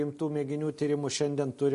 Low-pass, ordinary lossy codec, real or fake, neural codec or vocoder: 10.8 kHz; MP3, 48 kbps; real; none